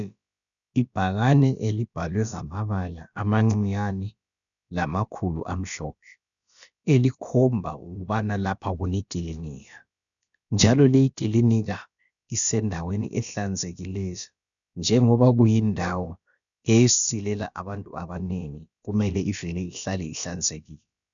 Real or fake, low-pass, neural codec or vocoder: fake; 7.2 kHz; codec, 16 kHz, about 1 kbps, DyCAST, with the encoder's durations